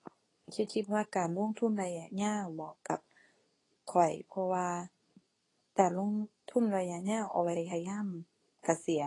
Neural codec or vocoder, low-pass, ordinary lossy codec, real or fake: codec, 24 kHz, 0.9 kbps, WavTokenizer, medium speech release version 2; 10.8 kHz; AAC, 32 kbps; fake